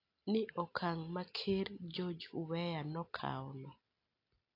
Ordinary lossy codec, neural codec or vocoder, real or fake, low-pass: AAC, 32 kbps; none; real; 5.4 kHz